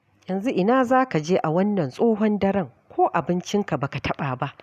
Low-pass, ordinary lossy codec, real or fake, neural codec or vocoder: 14.4 kHz; none; real; none